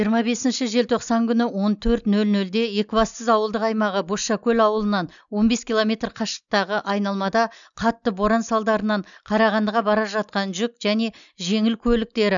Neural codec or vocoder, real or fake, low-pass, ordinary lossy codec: none; real; 7.2 kHz; MP3, 96 kbps